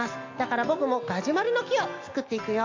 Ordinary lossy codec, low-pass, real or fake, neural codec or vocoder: AAC, 48 kbps; 7.2 kHz; real; none